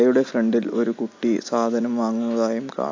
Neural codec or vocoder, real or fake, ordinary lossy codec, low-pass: none; real; AAC, 48 kbps; 7.2 kHz